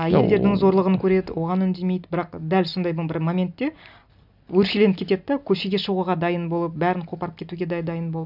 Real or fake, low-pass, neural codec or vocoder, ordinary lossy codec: real; 5.4 kHz; none; none